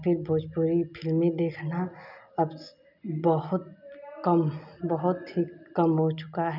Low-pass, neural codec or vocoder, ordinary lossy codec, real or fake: 5.4 kHz; none; none; real